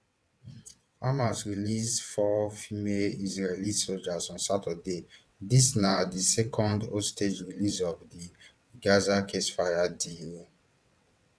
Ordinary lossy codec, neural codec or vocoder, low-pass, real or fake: none; vocoder, 22.05 kHz, 80 mel bands, WaveNeXt; none; fake